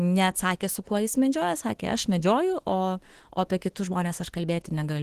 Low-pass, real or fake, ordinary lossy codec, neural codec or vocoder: 14.4 kHz; fake; Opus, 16 kbps; autoencoder, 48 kHz, 32 numbers a frame, DAC-VAE, trained on Japanese speech